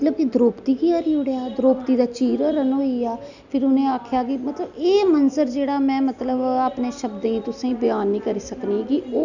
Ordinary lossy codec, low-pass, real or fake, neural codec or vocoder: none; 7.2 kHz; real; none